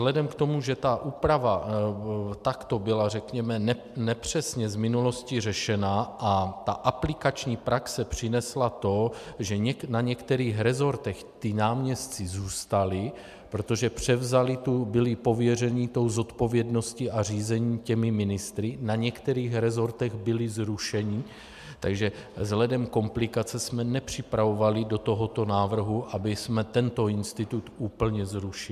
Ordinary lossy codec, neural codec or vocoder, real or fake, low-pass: MP3, 96 kbps; none; real; 14.4 kHz